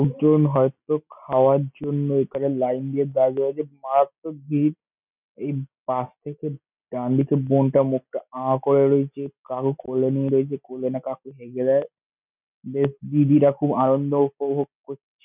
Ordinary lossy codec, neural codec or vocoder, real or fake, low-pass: none; none; real; 3.6 kHz